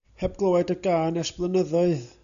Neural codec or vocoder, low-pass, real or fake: none; 7.2 kHz; real